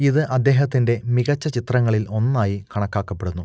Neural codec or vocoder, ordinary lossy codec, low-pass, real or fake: none; none; none; real